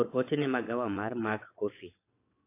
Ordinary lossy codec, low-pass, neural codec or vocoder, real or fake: AAC, 24 kbps; 3.6 kHz; vocoder, 22.05 kHz, 80 mel bands, WaveNeXt; fake